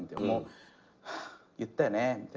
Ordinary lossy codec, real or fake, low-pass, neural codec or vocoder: Opus, 24 kbps; real; 7.2 kHz; none